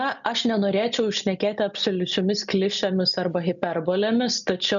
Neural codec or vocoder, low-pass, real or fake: none; 7.2 kHz; real